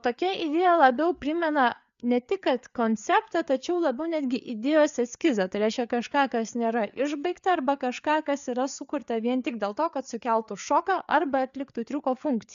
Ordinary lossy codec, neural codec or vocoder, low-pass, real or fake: MP3, 96 kbps; codec, 16 kHz, 4 kbps, FreqCodec, larger model; 7.2 kHz; fake